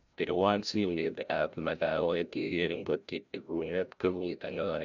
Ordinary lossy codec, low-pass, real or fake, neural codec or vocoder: none; 7.2 kHz; fake; codec, 16 kHz, 0.5 kbps, FreqCodec, larger model